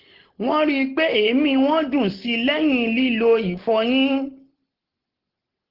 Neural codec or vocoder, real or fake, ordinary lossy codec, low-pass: none; real; Opus, 16 kbps; 5.4 kHz